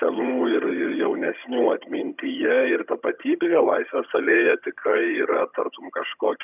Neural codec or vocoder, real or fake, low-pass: vocoder, 22.05 kHz, 80 mel bands, HiFi-GAN; fake; 3.6 kHz